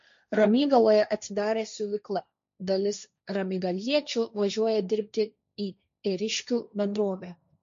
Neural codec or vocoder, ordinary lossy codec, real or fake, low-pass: codec, 16 kHz, 1.1 kbps, Voila-Tokenizer; MP3, 48 kbps; fake; 7.2 kHz